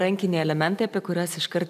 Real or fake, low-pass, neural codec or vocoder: fake; 14.4 kHz; vocoder, 44.1 kHz, 128 mel bands every 256 samples, BigVGAN v2